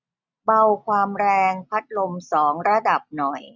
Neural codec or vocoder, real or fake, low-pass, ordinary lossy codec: none; real; 7.2 kHz; none